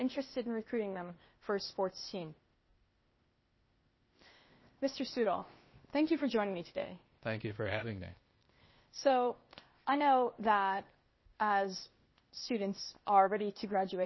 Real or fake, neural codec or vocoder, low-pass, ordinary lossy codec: fake; codec, 16 kHz, 0.8 kbps, ZipCodec; 7.2 kHz; MP3, 24 kbps